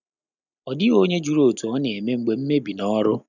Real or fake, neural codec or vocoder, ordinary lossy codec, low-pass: real; none; none; 7.2 kHz